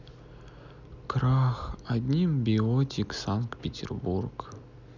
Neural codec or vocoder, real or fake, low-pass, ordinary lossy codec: none; real; 7.2 kHz; none